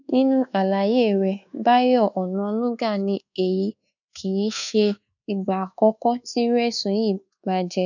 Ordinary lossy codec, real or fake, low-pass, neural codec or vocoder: none; fake; 7.2 kHz; codec, 24 kHz, 1.2 kbps, DualCodec